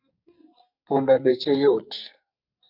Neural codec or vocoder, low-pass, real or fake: codec, 44.1 kHz, 2.6 kbps, SNAC; 5.4 kHz; fake